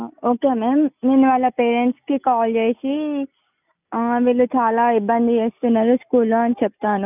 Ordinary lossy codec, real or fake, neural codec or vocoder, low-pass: none; real; none; 3.6 kHz